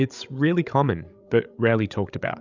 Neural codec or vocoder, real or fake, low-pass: codec, 16 kHz, 16 kbps, FreqCodec, larger model; fake; 7.2 kHz